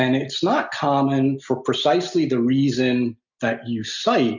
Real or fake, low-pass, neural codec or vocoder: real; 7.2 kHz; none